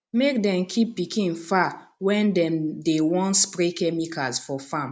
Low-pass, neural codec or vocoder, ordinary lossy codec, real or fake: none; none; none; real